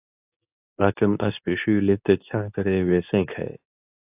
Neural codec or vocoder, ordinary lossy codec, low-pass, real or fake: codec, 24 kHz, 0.9 kbps, WavTokenizer, medium speech release version 2; AAC, 32 kbps; 3.6 kHz; fake